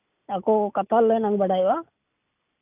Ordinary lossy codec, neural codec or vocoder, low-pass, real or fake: none; none; 3.6 kHz; real